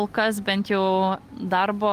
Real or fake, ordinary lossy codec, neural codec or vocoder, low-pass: real; Opus, 32 kbps; none; 14.4 kHz